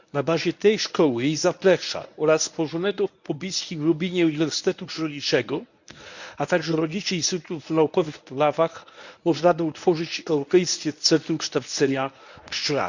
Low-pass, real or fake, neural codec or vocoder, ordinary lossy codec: 7.2 kHz; fake; codec, 24 kHz, 0.9 kbps, WavTokenizer, medium speech release version 1; none